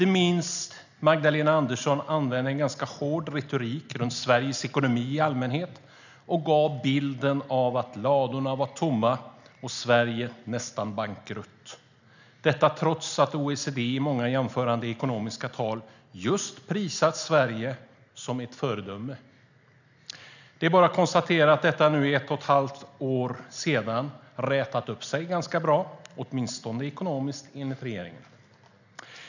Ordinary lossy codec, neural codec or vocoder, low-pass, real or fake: none; none; 7.2 kHz; real